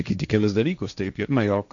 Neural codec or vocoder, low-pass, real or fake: codec, 16 kHz, 1.1 kbps, Voila-Tokenizer; 7.2 kHz; fake